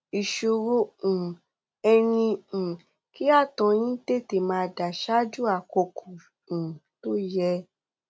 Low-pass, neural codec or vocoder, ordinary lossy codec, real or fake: none; none; none; real